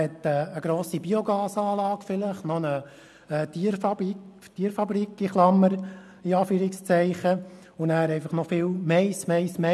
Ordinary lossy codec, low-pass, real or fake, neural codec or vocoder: none; none; real; none